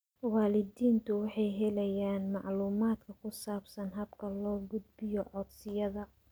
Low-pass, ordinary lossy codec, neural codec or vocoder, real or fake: none; none; none; real